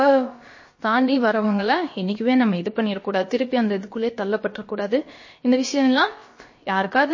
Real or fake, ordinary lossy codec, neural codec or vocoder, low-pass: fake; MP3, 32 kbps; codec, 16 kHz, about 1 kbps, DyCAST, with the encoder's durations; 7.2 kHz